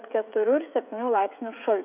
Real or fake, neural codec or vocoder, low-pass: fake; codec, 16 kHz, 16 kbps, FreqCodec, smaller model; 3.6 kHz